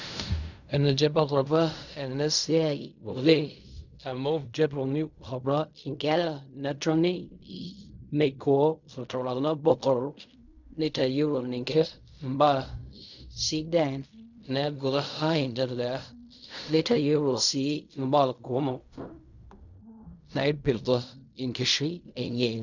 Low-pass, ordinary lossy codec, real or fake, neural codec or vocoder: 7.2 kHz; none; fake; codec, 16 kHz in and 24 kHz out, 0.4 kbps, LongCat-Audio-Codec, fine tuned four codebook decoder